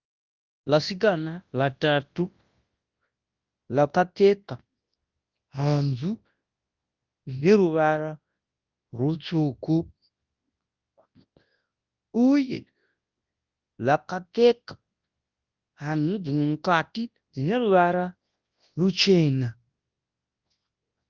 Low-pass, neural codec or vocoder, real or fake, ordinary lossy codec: 7.2 kHz; codec, 24 kHz, 0.9 kbps, WavTokenizer, large speech release; fake; Opus, 32 kbps